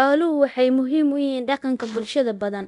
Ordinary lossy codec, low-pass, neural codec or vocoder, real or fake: none; 10.8 kHz; codec, 24 kHz, 0.9 kbps, DualCodec; fake